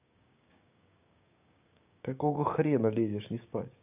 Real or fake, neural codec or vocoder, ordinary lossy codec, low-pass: fake; codec, 16 kHz, 6 kbps, DAC; none; 3.6 kHz